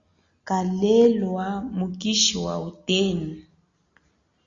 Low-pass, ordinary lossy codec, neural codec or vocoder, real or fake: 7.2 kHz; Opus, 64 kbps; none; real